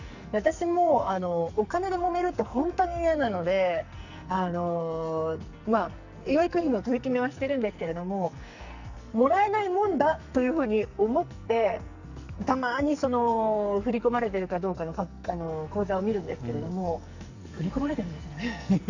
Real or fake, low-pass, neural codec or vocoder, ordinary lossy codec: fake; 7.2 kHz; codec, 44.1 kHz, 2.6 kbps, SNAC; none